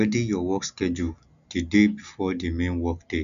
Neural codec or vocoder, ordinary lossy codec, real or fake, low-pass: none; none; real; 7.2 kHz